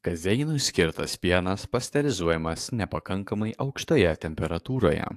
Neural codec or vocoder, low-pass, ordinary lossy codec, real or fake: codec, 44.1 kHz, 7.8 kbps, DAC; 14.4 kHz; AAC, 64 kbps; fake